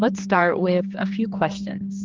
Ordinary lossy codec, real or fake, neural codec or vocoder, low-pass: Opus, 32 kbps; fake; codec, 16 kHz, 2 kbps, X-Codec, HuBERT features, trained on general audio; 7.2 kHz